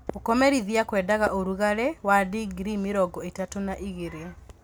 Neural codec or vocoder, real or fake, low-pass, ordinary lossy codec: none; real; none; none